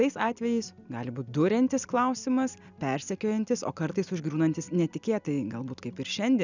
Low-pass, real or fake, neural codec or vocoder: 7.2 kHz; real; none